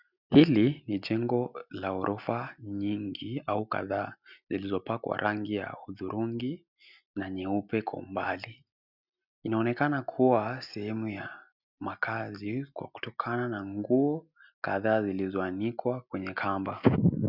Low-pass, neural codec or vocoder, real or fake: 5.4 kHz; none; real